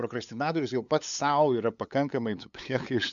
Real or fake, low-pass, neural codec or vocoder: fake; 7.2 kHz; codec, 16 kHz, 8 kbps, FunCodec, trained on LibriTTS, 25 frames a second